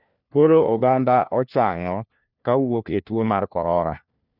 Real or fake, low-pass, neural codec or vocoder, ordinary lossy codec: fake; 5.4 kHz; codec, 16 kHz, 1 kbps, FunCodec, trained on LibriTTS, 50 frames a second; none